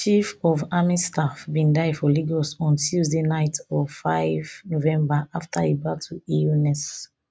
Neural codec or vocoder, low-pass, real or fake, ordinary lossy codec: none; none; real; none